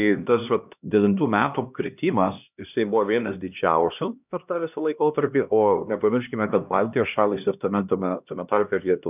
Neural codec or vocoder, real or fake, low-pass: codec, 16 kHz, 1 kbps, X-Codec, HuBERT features, trained on LibriSpeech; fake; 3.6 kHz